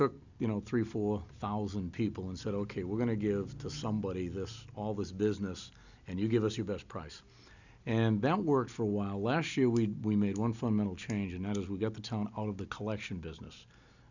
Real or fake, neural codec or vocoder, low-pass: real; none; 7.2 kHz